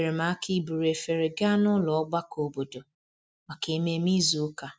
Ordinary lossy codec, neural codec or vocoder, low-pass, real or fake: none; none; none; real